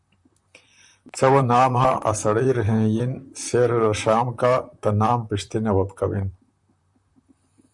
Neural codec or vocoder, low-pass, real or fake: vocoder, 44.1 kHz, 128 mel bands, Pupu-Vocoder; 10.8 kHz; fake